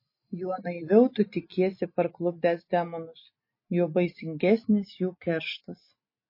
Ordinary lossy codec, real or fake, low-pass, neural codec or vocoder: MP3, 24 kbps; real; 5.4 kHz; none